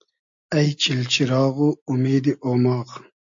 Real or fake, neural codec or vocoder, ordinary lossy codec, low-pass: real; none; MP3, 48 kbps; 7.2 kHz